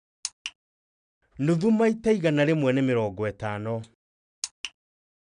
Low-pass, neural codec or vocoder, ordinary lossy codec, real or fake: 9.9 kHz; none; none; real